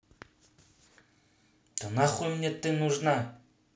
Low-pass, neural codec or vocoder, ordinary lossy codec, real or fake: none; none; none; real